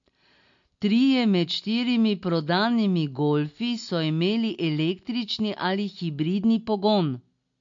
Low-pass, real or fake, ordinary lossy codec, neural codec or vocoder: 7.2 kHz; real; MP3, 48 kbps; none